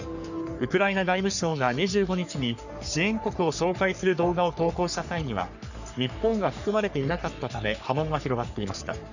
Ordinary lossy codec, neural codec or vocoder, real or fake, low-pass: none; codec, 44.1 kHz, 3.4 kbps, Pupu-Codec; fake; 7.2 kHz